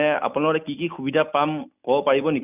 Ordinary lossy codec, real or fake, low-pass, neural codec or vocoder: none; real; 3.6 kHz; none